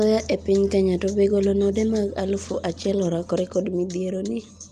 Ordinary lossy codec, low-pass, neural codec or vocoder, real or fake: Opus, 32 kbps; 14.4 kHz; none; real